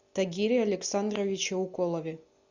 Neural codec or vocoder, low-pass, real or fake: none; 7.2 kHz; real